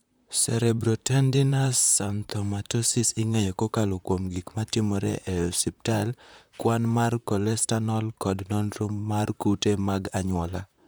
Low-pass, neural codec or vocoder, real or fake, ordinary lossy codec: none; vocoder, 44.1 kHz, 128 mel bands, Pupu-Vocoder; fake; none